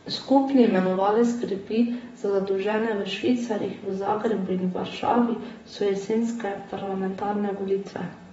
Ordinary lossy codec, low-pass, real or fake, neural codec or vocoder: AAC, 24 kbps; 19.8 kHz; fake; vocoder, 44.1 kHz, 128 mel bands, Pupu-Vocoder